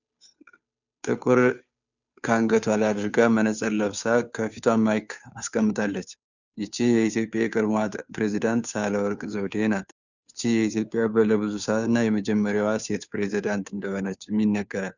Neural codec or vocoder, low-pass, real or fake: codec, 16 kHz, 2 kbps, FunCodec, trained on Chinese and English, 25 frames a second; 7.2 kHz; fake